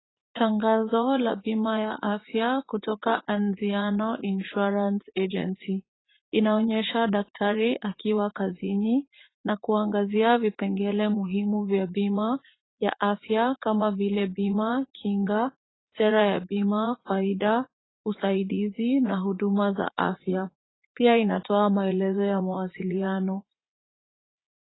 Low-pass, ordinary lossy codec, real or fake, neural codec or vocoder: 7.2 kHz; AAC, 16 kbps; fake; vocoder, 44.1 kHz, 80 mel bands, Vocos